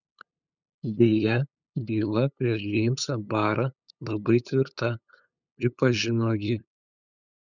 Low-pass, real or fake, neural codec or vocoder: 7.2 kHz; fake; codec, 16 kHz, 8 kbps, FunCodec, trained on LibriTTS, 25 frames a second